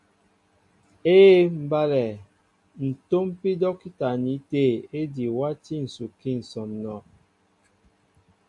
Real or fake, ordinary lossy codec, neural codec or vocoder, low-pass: real; AAC, 64 kbps; none; 10.8 kHz